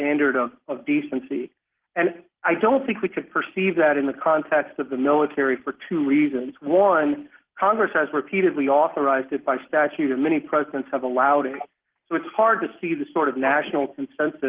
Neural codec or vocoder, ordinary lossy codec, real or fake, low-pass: none; Opus, 16 kbps; real; 3.6 kHz